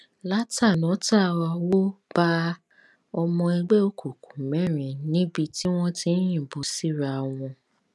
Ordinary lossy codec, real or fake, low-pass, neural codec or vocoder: none; real; none; none